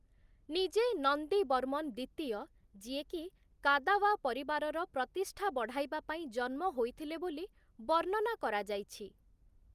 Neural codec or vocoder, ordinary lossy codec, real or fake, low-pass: none; Opus, 32 kbps; real; 14.4 kHz